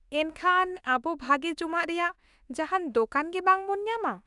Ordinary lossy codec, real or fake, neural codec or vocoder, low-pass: none; fake; autoencoder, 48 kHz, 32 numbers a frame, DAC-VAE, trained on Japanese speech; 10.8 kHz